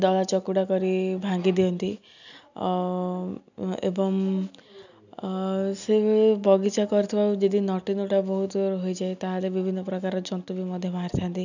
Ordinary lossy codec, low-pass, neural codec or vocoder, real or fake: none; 7.2 kHz; none; real